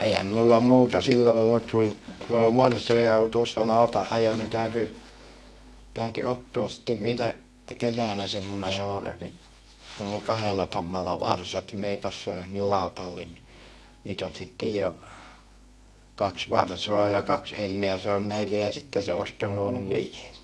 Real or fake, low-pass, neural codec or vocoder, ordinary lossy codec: fake; none; codec, 24 kHz, 0.9 kbps, WavTokenizer, medium music audio release; none